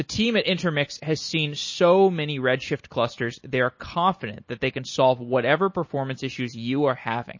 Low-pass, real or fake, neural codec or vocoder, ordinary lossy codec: 7.2 kHz; real; none; MP3, 32 kbps